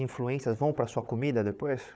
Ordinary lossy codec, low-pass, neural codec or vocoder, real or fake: none; none; codec, 16 kHz, 4 kbps, FunCodec, trained on Chinese and English, 50 frames a second; fake